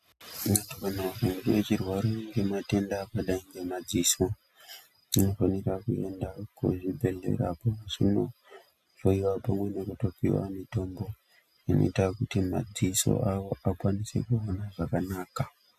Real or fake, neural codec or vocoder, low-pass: real; none; 14.4 kHz